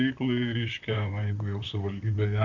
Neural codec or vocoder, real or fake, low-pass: vocoder, 44.1 kHz, 128 mel bands, Pupu-Vocoder; fake; 7.2 kHz